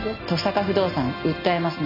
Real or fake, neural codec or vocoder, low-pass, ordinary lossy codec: real; none; 5.4 kHz; none